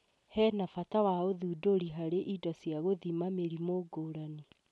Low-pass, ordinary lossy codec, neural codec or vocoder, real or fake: none; none; none; real